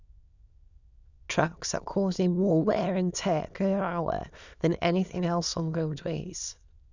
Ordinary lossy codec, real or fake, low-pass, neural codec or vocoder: none; fake; 7.2 kHz; autoencoder, 22.05 kHz, a latent of 192 numbers a frame, VITS, trained on many speakers